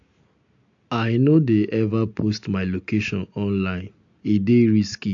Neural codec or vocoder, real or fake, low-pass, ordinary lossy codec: none; real; 7.2 kHz; AAC, 64 kbps